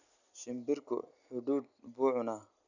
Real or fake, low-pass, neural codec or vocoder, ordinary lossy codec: real; 7.2 kHz; none; none